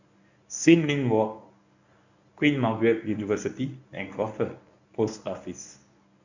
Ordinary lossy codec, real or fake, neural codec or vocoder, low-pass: none; fake; codec, 24 kHz, 0.9 kbps, WavTokenizer, medium speech release version 1; 7.2 kHz